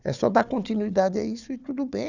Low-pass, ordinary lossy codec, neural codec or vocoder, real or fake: 7.2 kHz; none; codec, 16 kHz, 4 kbps, FunCodec, trained on Chinese and English, 50 frames a second; fake